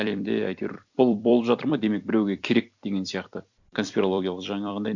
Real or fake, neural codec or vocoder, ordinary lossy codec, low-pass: fake; vocoder, 44.1 kHz, 128 mel bands every 256 samples, BigVGAN v2; none; 7.2 kHz